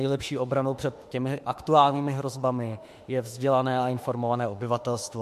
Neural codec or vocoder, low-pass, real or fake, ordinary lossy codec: autoencoder, 48 kHz, 32 numbers a frame, DAC-VAE, trained on Japanese speech; 14.4 kHz; fake; MP3, 64 kbps